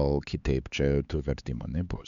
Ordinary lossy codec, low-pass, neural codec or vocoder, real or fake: Opus, 64 kbps; 7.2 kHz; codec, 16 kHz, 4 kbps, X-Codec, HuBERT features, trained on LibriSpeech; fake